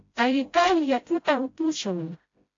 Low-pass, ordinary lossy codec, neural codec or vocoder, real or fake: 7.2 kHz; AAC, 48 kbps; codec, 16 kHz, 0.5 kbps, FreqCodec, smaller model; fake